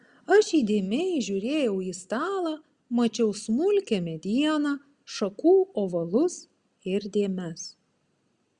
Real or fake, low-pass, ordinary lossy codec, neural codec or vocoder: real; 9.9 kHz; Opus, 64 kbps; none